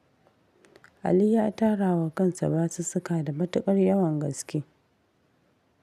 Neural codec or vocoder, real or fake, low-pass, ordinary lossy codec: none; real; 14.4 kHz; none